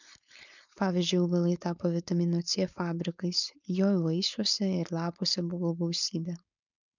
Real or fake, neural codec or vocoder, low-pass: fake; codec, 16 kHz, 4.8 kbps, FACodec; 7.2 kHz